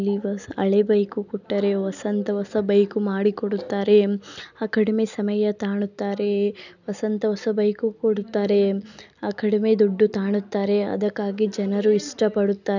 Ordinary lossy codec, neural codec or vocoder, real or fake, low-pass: none; none; real; 7.2 kHz